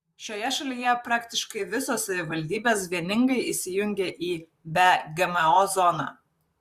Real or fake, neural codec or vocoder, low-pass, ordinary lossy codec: fake; vocoder, 44.1 kHz, 128 mel bands, Pupu-Vocoder; 14.4 kHz; Opus, 64 kbps